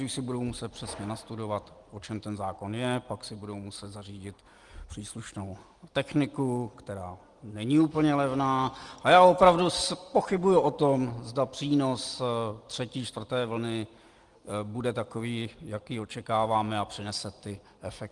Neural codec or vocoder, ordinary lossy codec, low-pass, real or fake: none; Opus, 24 kbps; 10.8 kHz; real